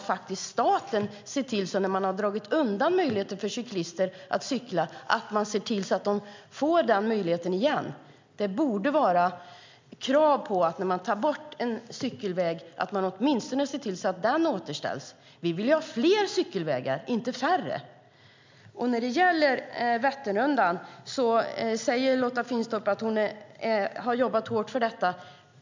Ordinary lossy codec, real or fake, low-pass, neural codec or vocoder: MP3, 64 kbps; real; 7.2 kHz; none